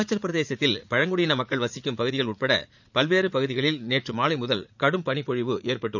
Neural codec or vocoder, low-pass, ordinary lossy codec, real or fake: vocoder, 44.1 kHz, 80 mel bands, Vocos; 7.2 kHz; none; fake